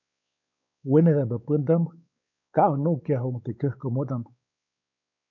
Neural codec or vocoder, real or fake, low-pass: codec, 16 kHz, 4 kbps, X-Codec, WavLM features, trained on Multilingual LibriSpeech; fake; 7.2 kHz